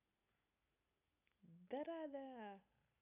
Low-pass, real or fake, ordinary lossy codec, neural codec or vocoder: 3.6 kHz; real; none; none